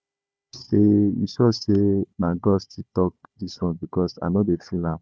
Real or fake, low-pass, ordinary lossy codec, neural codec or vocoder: fake; none; none; codec, 16 kHz, 4 kbps, FunCodec, trained on Chinese and English, 50 frames a second